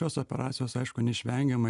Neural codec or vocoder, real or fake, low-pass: none; real; 10.8 kHz